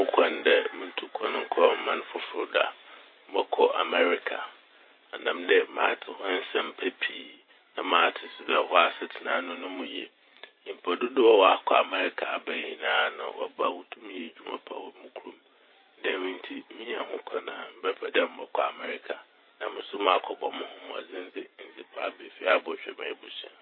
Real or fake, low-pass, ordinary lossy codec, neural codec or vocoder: fake; 5.4 kHz; MP3, 24 kbps; vocoder, 24 kHz, 100 mel bands, Vocos